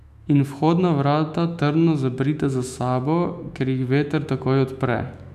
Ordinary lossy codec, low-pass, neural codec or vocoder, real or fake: none; 14.4 kHz; autoencoder, 48 kHz, 128 numbers a frame, DAC-VAE, trained on Japanese speech; fake